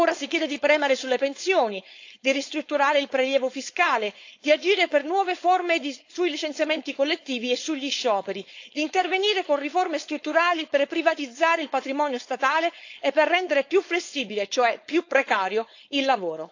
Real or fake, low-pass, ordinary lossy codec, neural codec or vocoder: fake; 7.2 kHz; AAC, 48 kbps; codec, 16 kHz, 4.8 kbps, FACodec